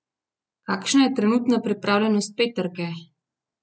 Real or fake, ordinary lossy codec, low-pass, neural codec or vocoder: real; none; none; none